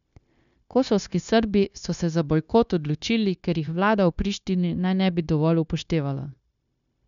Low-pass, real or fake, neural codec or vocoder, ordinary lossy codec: 7.2 kHz; fake; codec, 16 kHz, 0.9 kbps, LongCat-Audio-Codec; none